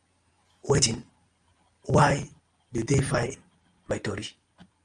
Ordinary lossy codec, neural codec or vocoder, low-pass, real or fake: Opus, 32 kbps; none; 9.9 kHz; real